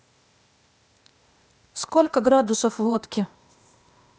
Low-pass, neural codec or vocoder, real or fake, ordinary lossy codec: none; codec, 16 kHz, 0.8 kbps, ZipCodec; fake; none